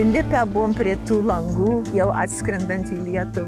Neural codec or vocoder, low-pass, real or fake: codec, 44.1 kHz, 7.8 kbps, DAC; 14.4 kHz; fake